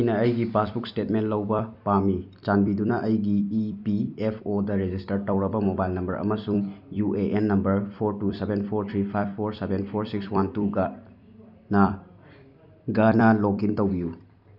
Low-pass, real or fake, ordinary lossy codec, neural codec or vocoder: 5.4 kHz; fake; none; vocoder, 44.1 kHz, 128 mel bands every 256 samples, BigVGAN v2